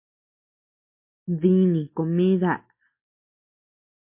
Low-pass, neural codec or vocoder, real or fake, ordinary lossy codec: 3.6 kHz; none; real; MP3, 24 kbps